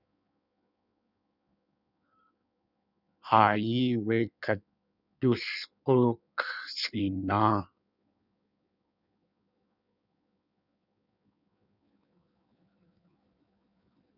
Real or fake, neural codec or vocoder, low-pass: fake; codec, 16 kHz in and 24 kHz out, 1.1 kbps, FireRedTTS-2 codec; 5.4 kHz